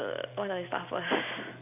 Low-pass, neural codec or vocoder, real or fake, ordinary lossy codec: 3.6 kHz; none; real; none